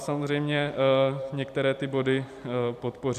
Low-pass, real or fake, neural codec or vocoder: 14.4 kHz; fake; vocoder, 44.1 kHz, 128 mel bands every 256 samples, BigVGAN v2